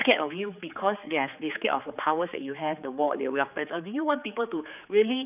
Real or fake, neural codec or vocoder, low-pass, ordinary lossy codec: fake; codec, 16 kHz, 4 kbps, X-Codec, HuBERT features, trained on general audio; 3.6 kHz; none